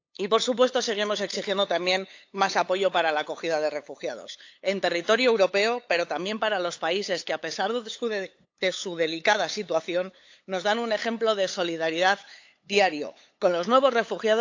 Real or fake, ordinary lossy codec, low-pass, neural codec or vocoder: fake; AAC, 48 kbps; 7.2 kHz; codec, 16 kHz, 8 kbps, FunCodec, trained on LibriTTS, 25 frames a second